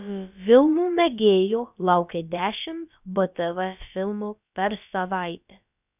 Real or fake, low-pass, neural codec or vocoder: fake; 3.6 kHz; codec, 16 kHz, about 1 kbps, DyCAST, with the encoder's durations